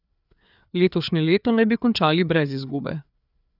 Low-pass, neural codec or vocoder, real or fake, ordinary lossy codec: 5.4 kHz; codec, 16 kHz, 4 kbps, FreqCodec, larger model; fake; none